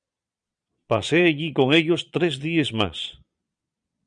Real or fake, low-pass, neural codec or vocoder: fake; 9.9 kHz; vocoder, 22.05 kHz, 80 mel bands, Vocos